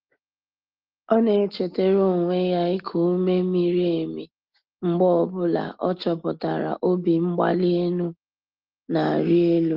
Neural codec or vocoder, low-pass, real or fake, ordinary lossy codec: none; 5.4 kHz; real; Opus, 16 kbps